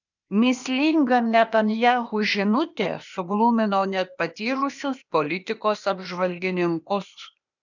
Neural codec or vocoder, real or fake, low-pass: codec, 16 kHz, 0.8 kbps, ZipCodec; fake; 7.2 kHz